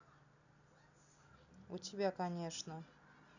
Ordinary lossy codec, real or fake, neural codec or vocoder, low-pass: none; real; none; 7.2 kHz